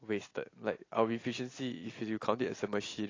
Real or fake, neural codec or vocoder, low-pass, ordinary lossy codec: real; none; 7.2 kHz; AAC, 32 kbps